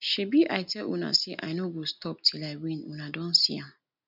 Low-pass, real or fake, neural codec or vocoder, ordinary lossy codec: 5.4 kHz; real; none; none